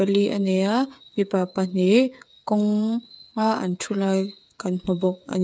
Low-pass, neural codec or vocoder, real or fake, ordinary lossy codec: none; codec, 16 kHz, 8 kbps, FreqCodec, smaller model; fake; none